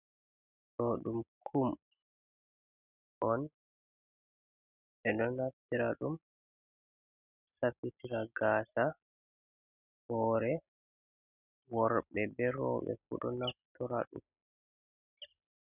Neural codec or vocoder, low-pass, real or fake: none; 3.6 kHz; real